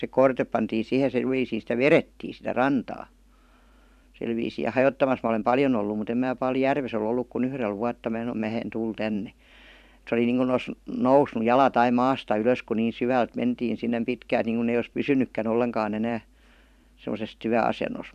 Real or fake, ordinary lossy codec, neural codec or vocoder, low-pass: fake; none; vocoder, 44.1 kHz, 128 mel bands every 512 samples, BigVGAN v2; 14.4 kHz